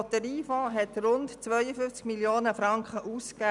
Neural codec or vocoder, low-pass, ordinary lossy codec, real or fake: none; 10.8 kHz; none; real